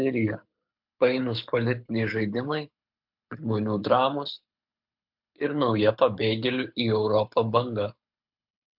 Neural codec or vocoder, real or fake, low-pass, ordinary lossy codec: codec, 24 kHz, 6 kbps, HILCodec; fake; 5.4 kHz; MP3, 48 kbps